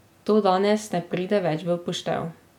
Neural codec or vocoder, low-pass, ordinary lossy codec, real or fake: none; 19.8 kHz; none; real